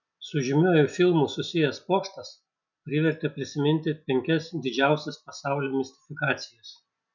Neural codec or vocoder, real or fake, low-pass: none; real; 7.2 kHz